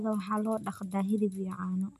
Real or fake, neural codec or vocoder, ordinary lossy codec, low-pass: real; none; none; 14.4 kHz